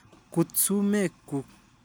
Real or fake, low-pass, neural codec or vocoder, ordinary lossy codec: real; none; none; none